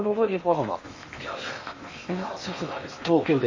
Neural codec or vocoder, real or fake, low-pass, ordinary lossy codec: codec, 16 kHz in and 24 kHz out, 0.8 kbps, FocalCodec, streaming, 65536 codes; fake; 7.2 kHz; MP3, 32 kbps